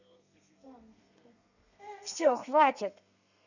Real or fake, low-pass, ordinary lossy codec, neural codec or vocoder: fake; 7.2 kHz; none; codec, 32 kHz, 1.9 kbps, SNAC